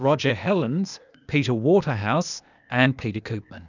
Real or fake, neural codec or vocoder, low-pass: fake; codec, 16 kHz, 0.8 kbps, ZipCodec; 7.2 kHz